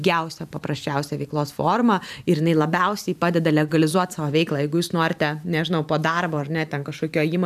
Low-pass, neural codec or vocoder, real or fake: 14.4 kHz; vocoder, 44.1 kHz, 128 mel bands every 512 samples, BigVGAN v2; fake